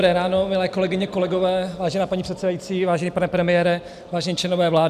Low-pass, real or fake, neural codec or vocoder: 14.4 kHz; real; none